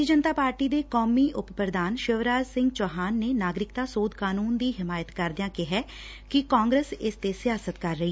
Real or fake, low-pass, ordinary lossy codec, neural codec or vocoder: real; none; none; none